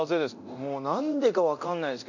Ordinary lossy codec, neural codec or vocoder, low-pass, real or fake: none; codec, 24 kHz, 0.9 kbps, DualCodec; 7.2 kHz; fake